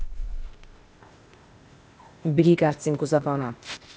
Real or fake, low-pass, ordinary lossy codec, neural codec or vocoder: fake; none; none; codec, 16 kHz, 0.8 kbps, ZipCodec